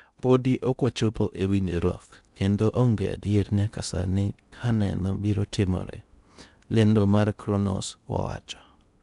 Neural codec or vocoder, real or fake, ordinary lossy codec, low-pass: codec, 16 kHz in and 24 kHz out, 0.6 kbps, FocalCodec, streaming, 2048 codes; fake; none; 10.8 kHz